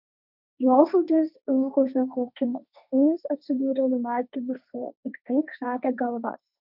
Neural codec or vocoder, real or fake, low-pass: codec, 16 kHz, 1.1 kbps, Voila-Tokenizer; fake; 5.4 kHz